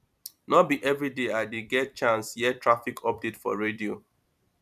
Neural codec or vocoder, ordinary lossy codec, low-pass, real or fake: vocoder, 44.1 kHz, 128 mel bands every 256 samples, BigVGAN v2; none; 14.4 kHz; fake